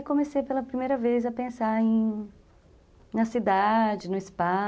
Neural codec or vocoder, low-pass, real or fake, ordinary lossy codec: none; none; real; none